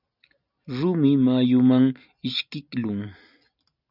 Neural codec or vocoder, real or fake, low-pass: none; real; 5.4 kHz